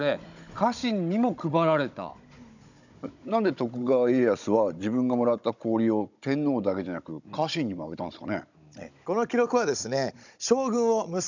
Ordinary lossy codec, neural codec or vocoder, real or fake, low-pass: none; codec, 16 kHz, 16 kbps, FunCodec, trained on Chinese and English, 50 frames a second; fake; 7.2 kHz